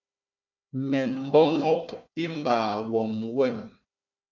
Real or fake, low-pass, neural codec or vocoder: fake; 7.2 kHz; codec, 16 kHz, 1 kbps, FunCodec, trained on Chinese and English, 50 frames a second